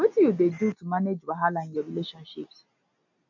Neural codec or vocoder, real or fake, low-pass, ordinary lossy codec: none; real; 7.2 kHz; none